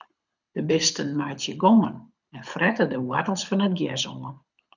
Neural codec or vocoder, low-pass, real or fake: codec, 24 kHz, 6 kbps, HILCodec; 7.2 kHz; fake